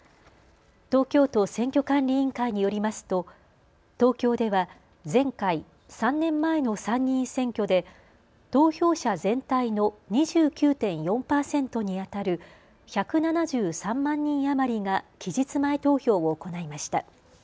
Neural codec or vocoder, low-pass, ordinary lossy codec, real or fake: none; none; none; real